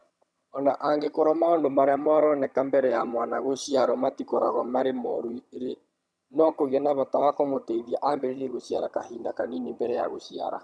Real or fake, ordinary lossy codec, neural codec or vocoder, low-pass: fake; none; vocoder, 22.05 kHz, 80 mel bands, HiFi-GAN; none